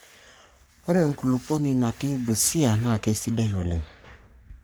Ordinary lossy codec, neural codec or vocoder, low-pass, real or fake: none; codec, 44.1 kHz, 3.4 kbps, Pupu-Codec; none; fake